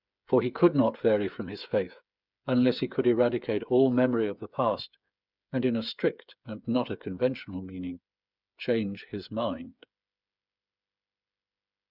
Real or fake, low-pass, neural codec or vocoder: fake; 5.4 kHz; codec, 16 kHz, 8 kbps, FreqCodec, smaller model